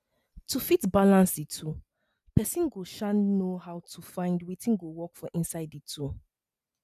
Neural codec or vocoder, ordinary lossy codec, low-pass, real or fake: none; none; 14.4 kHz; real